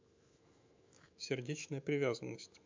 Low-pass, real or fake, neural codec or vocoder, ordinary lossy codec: 7.2 kHz; fake; autoencoder, 48 kHz, 128 numbers a frame, DAC-VAE, trained on Japanese speech; MP3, 64 kbps